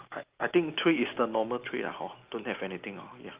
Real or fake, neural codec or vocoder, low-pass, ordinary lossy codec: real; none; 3.6 kHz; Opus, 32 kbps